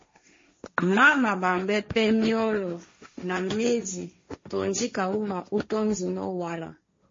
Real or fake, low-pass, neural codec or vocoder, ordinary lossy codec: fake; 7.2 kHz; codec, 16 kHz, 1.1 kbps, Voila-Tokenizer; MP3, 32 kbps